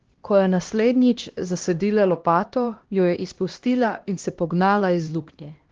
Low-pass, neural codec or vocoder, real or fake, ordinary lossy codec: 7.2 kHz; codec, 16 kHz, 1 kbps, X-Codec, HuBERT features, trained on LibriSpeech; fake; Opus, 16 kbps